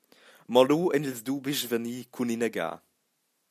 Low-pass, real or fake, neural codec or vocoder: 14.4 kHz; real; none